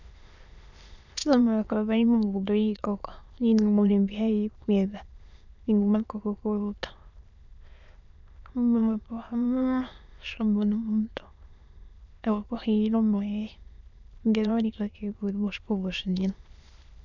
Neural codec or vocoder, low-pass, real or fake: autoencoder, 22.05 kHz, a latent of 192 numbers a frame, VITS, trained on many speakers; 7.2 kHz; fake